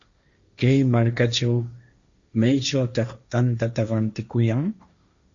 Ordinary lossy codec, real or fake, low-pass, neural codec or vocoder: Opus, 64 kbps; fake; 7.2 kHz; codec, 16 kHz, 1.1 kbps, Voila-Tokenizer